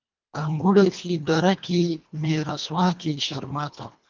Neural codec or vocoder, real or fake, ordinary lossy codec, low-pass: codec, 24 kHz, 1.5 kbps, HILCodec; fake; Opus, 24 kbps; 7.2 kHz